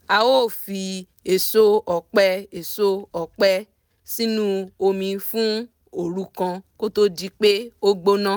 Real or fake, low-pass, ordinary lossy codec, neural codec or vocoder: real; none; none; none